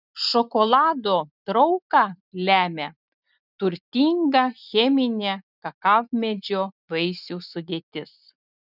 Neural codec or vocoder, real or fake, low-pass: none; real; 5.4 kHz